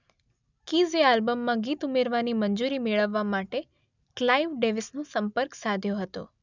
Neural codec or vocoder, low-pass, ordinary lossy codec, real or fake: none; 7.2 kHz; none; real